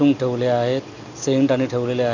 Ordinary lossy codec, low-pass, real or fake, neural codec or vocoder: none; 7.2 kHz; real; none